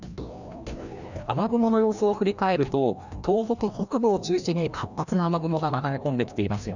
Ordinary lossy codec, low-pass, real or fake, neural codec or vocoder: none; 7.2 kHz; fake; codec, 16 kHz, 1 kbps, FreqCodec, larger model